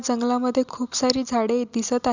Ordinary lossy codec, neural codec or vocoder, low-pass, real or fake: Opus, 64 kbps; none; 7.2 kHz; real